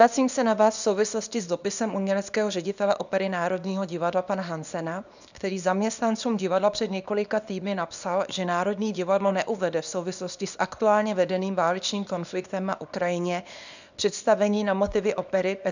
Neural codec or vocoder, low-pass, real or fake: codec, 24 kHz, 0.9 kbps, WavTokenizer, small release; 7.2 kHz; fake